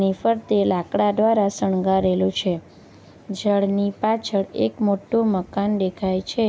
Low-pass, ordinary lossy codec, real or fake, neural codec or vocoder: none; none; real; none